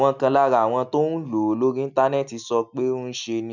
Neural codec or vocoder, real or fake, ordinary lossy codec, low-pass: none; real; none; 7.2 kHz